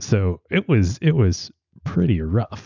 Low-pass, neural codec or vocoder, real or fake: 7.2 kHz; none; real